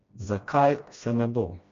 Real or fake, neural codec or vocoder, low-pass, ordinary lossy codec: fake; codec, 16 kHz, 1 kbps, FreqCodec, smaller model; 7.2 kHz; MP3, 48 kbps